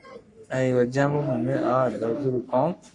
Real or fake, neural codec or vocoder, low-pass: fake; codec, 44.1 kHz, 3.4 kbps, Pupu-Codec; 10.8 kHz